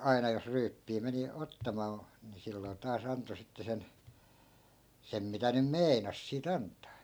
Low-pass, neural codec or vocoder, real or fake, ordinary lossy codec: none; none; real; none